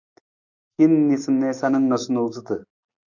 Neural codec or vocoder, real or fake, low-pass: none; real; 7.2 kHz